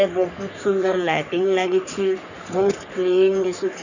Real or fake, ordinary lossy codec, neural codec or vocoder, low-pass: fake; none; codec, 44.1 kHz, 3.4 kbps, Pupu-Codec; 7.2 kHz